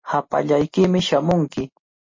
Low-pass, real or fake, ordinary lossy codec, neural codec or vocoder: 7.2 kHz; real; MP3, 32 kbps; none